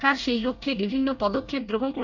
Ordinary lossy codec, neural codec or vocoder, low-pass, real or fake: none; codec, 24 kHz, 1 kbps, SNAC; 7.2 kHz; fake